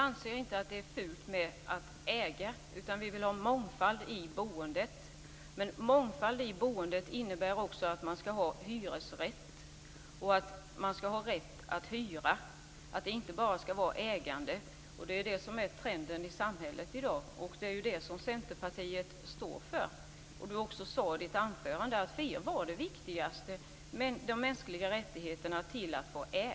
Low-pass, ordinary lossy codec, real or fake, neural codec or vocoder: none; none; real; none